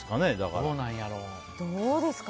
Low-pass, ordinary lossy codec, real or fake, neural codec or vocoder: none; none; real; none